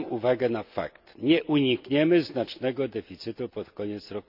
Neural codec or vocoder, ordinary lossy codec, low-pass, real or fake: none; none; 5.4 kHz; real